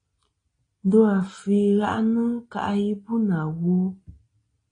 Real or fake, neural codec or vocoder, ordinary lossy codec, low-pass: real; none; AAC, 32 kbps; 9.9 kHz